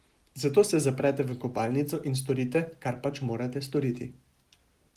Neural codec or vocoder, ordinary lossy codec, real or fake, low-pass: none; Opus, 24 kbps; real; 14.4 kHz